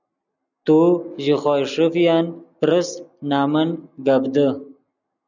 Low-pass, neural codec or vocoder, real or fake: 7.2 kHz; none; real